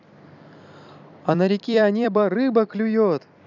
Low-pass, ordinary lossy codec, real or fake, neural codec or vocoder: 7.2 kHz; none; fake; vocoder, 44.1 kHz, 128 mel bands every 256 samples, BigVGAN v2